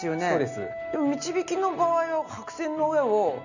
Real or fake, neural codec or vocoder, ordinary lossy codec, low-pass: real; none; none; 7.2 kHz